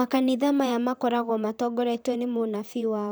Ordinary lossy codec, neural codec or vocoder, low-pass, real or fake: none; vocoder, 44.1 kHz, 128 mel bands, Pupu-Vocoder; none; fake